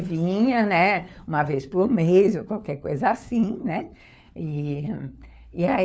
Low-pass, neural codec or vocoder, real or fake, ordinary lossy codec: none; codec, 16 kHz, 4 kbps, FunCodec, trained on LibriTTS, 50 frames a second; fake; none